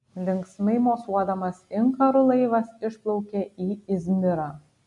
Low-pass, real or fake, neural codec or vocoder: 10.8 kHz; real; none